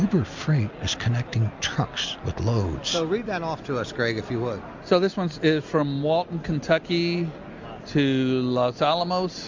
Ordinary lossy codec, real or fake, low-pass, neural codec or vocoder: MP3, 64 kbps; real; 7.2 kHz; none